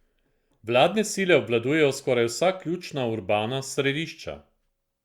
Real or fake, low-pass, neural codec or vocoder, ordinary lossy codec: real; 19.8 kHz; none; Opus, 64 kbps